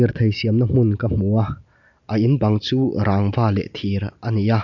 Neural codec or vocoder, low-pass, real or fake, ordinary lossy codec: none; 7.2 kHz; real; none